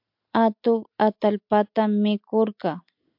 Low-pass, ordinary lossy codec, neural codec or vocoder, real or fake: 5.4 kHz; MP3, 48 kbps; none; real